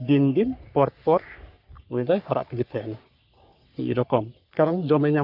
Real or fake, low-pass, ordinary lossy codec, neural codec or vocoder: fake; 5.4 kHz; none; codec, 44.1 kHz, 3.4 kbps, Pupu-Codec